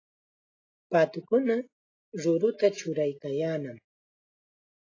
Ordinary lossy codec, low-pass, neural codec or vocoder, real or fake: AAC, 32 kbps; 7.2 kHz; none; real